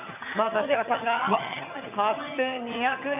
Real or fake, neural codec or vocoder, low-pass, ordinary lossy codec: fake; vocoder, 22.05 kHz, 80 mel bands, HiFi-GAN; 3.6 kHz; none